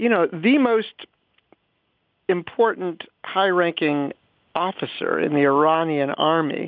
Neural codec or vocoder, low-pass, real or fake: none; 5.4 kHz; real